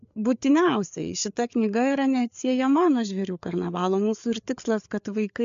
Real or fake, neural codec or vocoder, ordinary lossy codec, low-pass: fake; codec, 16 kHz, 4 kbps, FreqCodec, larger model; MP3, 64 kbps; 7.2 kHz